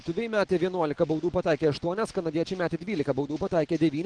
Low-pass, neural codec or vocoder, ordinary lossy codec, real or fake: 9.9 kHz; none; Opus, 16 kbps; real